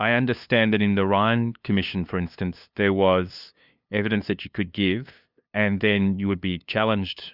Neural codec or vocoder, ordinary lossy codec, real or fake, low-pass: codec, 16 kHz, 2 kbps, FunCodec, trained on LibriTTS, 25 frames a second; Opus, 64 kbps; fake; 5.4 kHz